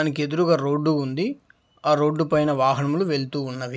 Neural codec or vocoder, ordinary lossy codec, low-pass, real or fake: none; none; none; real